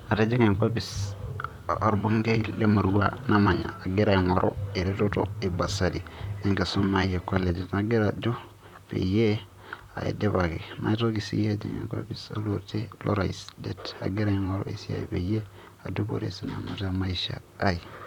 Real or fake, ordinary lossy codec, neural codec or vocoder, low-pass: fake; none; vocoder, 44.1 kHz, 128 mel bands, Pupu-Vocoder; 19.8 kHz